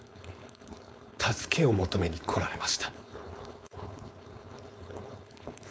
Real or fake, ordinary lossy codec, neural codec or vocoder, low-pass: fake; none; codec, 16 kHz, 4.8 kbps, FACodec; none